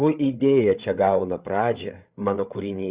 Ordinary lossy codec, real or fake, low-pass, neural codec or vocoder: Opus, 64 kbps; fake; 3.6 kHz; codec, 16 kHz, 8 kbps, FreqCodec, larger model